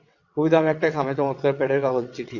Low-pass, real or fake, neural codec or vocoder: 7.2 kHz; fake; vocoder, 22.05 kHz, 80 mel bands, WaveNeXt